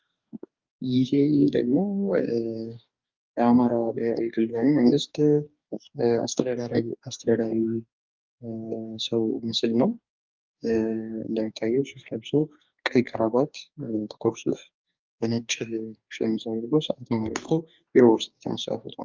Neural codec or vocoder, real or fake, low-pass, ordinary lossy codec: codec, 44.1 kHz, 2.6 kbps, DAC; fake; 7.2 kHz; Opus, 24 kbps